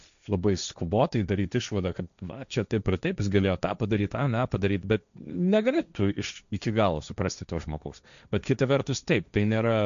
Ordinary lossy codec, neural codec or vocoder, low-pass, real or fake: MP3, 96 kbps; codec, 16 kHz, 1.1 kbps, Voila-Tokenizer; 7.2 kHz; fake